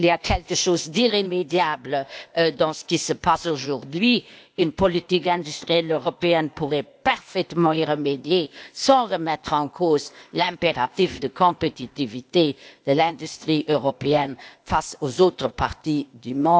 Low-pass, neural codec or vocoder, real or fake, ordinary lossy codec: none; codec, 16 kHz, 0.8 kbps, ZipCodec; fake; none